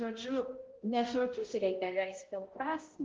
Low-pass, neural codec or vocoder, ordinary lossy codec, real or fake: 7.2 kHz; codec, 16 kHz, 0.5 kbps, X-Codec, HuBERT features, trained on balanced general audio; Opus, 16 kbps; fake